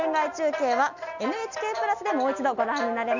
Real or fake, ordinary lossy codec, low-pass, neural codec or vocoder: real; none; 7.2 kHz; none